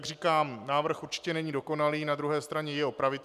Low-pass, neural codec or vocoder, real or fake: 14.4 kHz; none; real